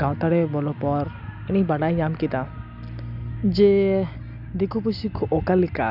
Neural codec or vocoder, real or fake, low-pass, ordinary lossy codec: none; real; 5.4 kHz; none